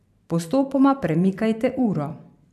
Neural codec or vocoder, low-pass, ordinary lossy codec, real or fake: vocoder, 44.1 kHz, 128 mel bands every 512 samples, BigVGAN v2; 14.4 kHz; AAC, 96 kbps; fake